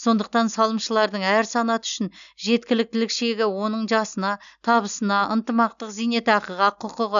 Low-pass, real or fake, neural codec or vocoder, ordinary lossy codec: 7.2 kHz; real; none; none